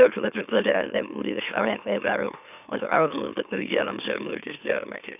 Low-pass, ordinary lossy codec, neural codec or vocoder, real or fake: 3.6 kHz; none; autoencoder, 44.1 kHz, a latent of 192 numbers a frame, MeloTTS; fake